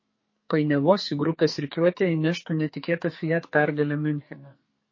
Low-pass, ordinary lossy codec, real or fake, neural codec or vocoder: 7.2 kHz; MP3, 32 kbps; fake; codec, 44.1 kHz, 2.6 kbps, SNAC